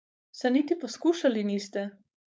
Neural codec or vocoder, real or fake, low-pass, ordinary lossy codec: codec, 16 kHz, 4.8 kbps, FACodec; fake; none; none